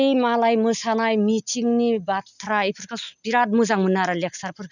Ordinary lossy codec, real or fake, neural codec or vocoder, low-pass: none; real; none; 7.2 kHz